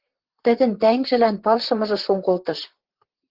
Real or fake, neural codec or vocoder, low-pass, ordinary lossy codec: fake; vocoder, 44.1 kHz, 128 mel bands, Pupu-Vocoder; 5.4 kHz; Opus, 16 kbps